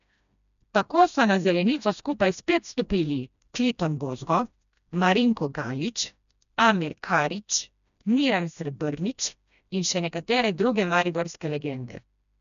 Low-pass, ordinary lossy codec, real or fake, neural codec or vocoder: 7.2 kHz; none; fake; codec, 16 kHz, 1 kbps, FreqCodec, smaller model